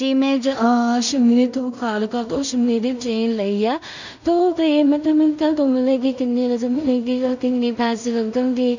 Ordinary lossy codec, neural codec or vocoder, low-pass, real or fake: none; codec, 16 kHz in and 24 kHz out, 0.4 kbps, LongCat-Audio-Codec, two codebook decoder; 7.2 kHz; fake